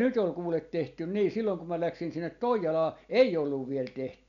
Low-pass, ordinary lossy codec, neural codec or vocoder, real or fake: 7.2 kHz; none; none; real